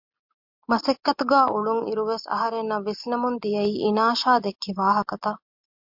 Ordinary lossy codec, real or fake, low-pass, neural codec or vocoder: MP3, 48 kbps; real; 5.4 kHz; none